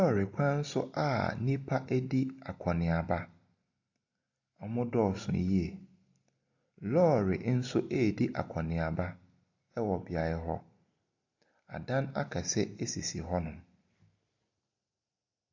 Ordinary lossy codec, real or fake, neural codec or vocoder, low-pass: AAC, 48 kbps; real; none; 7.2 kHz